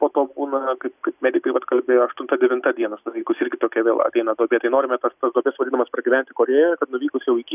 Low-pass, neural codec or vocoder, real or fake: 3.6 kHz; none; real